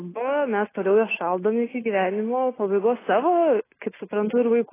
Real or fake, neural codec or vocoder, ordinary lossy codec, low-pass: real; none; AAC, 16 kbps; 3.6 kHz